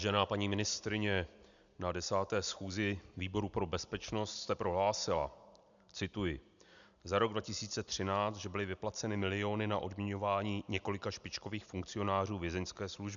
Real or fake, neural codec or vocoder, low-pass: real; none; 7.2 kHz